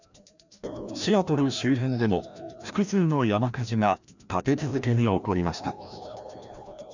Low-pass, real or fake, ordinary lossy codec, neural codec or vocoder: 7.2 kHz; fake; none; codec, 16 kHz, 1 kbps, FreqCodec, larger model